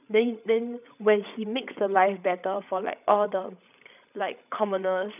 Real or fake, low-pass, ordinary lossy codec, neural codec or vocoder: fake; 3.6 kHz; none; codec, 16 kHz, 16 kbps, FreqCodec, larger model